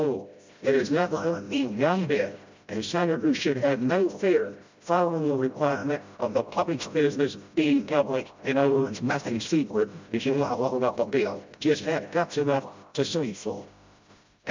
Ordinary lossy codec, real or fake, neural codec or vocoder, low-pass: MP3, 64 kbps; fake; codec, 16 kHz, 0.5 kbps, FreqCodec, smaller model; 7.2 kHz